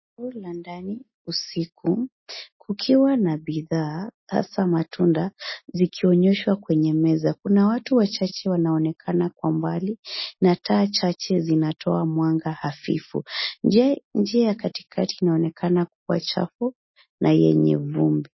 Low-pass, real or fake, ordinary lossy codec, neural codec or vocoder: 7.2 kHz; real; MP3, 24 kbps; none